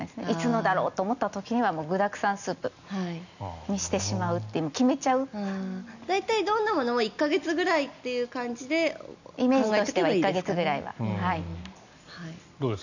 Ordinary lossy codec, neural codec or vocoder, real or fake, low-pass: none; none; real; 7.2 kHz